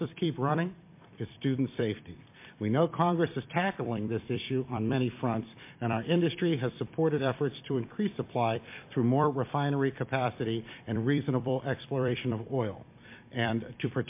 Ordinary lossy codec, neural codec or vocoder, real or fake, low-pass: MP3, 24 kbps; vocoder, 44.1 kHz, 128 mel bands every 256 samples, BigVGAN v2; fake; 3.6 kHz